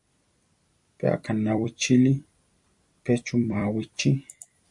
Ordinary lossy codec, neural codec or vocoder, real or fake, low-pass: MP3, 64 kbps; vocoder, 44.1 kHz, 128 mel bands every 256 samples, BigVGAN v2; fake; 10.8 kHz